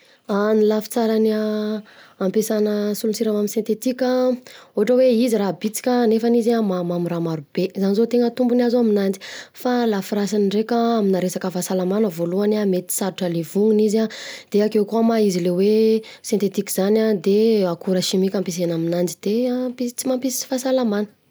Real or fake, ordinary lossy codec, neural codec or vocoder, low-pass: real; none; none; none